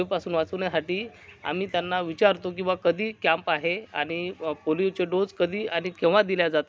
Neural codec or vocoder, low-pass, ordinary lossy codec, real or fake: none; none; none; real